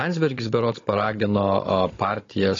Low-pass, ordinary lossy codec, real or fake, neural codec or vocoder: 7.2 kHz; AAC, 32 kbps; fake; codec, 16 kHz, 16 kbps, FunCodec, trained on Chinese and English, 50 frames a second